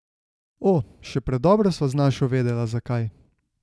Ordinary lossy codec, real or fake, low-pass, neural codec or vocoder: none; real; none; none